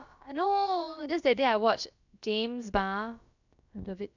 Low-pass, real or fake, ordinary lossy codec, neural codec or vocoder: 7.2 kHz; fake; none; codec, 16 kHz, about 1 kbps, DyCAST, with the encoder's durations